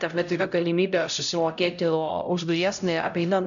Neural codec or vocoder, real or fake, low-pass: codec, 16 kHz, 0.5 kbps, X-Codec, HuBERT features, trained on LibriSpeech; fake; 7.2 kHz